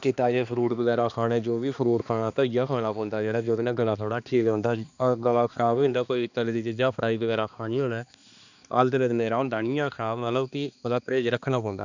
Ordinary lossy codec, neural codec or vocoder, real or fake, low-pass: none; codec, 16 kHz, 2 kbps, X-Codec, HuBERT features, trained on balanced general audio; fake; 7.2 kHz